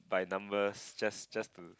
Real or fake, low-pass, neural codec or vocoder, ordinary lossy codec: real; none; none; none